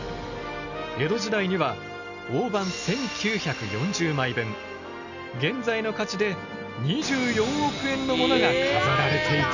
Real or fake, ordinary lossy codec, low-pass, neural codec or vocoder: real; none; 7.2 kHz; none